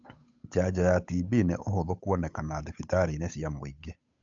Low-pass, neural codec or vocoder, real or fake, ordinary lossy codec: 7.2 kHz; codec, 16 kHz, 8 kbps, FunCodec, trained on Chinese and English, 25 frames a second; fake; AAC, 64 kbps